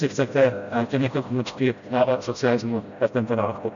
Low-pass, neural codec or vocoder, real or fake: 7.2 kHz; codec, 16 kHz, 0.5 kbps, FreqCodec, smaller model; fake